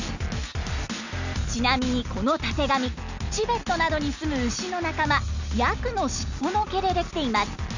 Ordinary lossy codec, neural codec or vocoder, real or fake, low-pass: none; none; real; 7.2 kHz